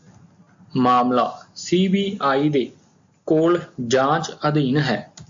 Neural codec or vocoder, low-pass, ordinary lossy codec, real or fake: none; 7.2 kHz; AAC, 64 kbps; real